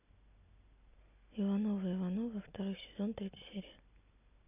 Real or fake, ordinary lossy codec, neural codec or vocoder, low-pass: real; none; none; 3.6 kHz